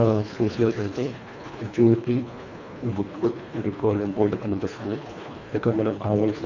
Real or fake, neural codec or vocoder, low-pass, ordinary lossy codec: fake; codec, 24 kHz, 1.5 kbps, HILCodec; 7.2 kHz; none